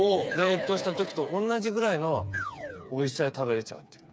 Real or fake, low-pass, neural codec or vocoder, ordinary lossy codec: fake; none; codec, 16 kHz, 4 kbps, FreqCodec, smaller model; none